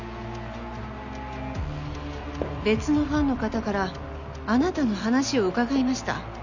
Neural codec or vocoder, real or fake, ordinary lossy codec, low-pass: none; real; none; 7.2 kHz